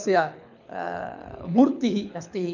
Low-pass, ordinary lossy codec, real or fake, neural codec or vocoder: 7.2 kHz; none; fake; codec, 24 kHz, 6 kbps, HILCodec